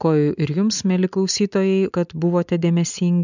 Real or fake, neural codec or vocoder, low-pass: real; none; 7.2 kHz